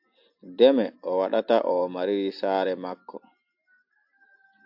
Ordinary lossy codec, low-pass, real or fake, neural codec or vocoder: AAC, 48 kbps; 5.4 kHz; real; none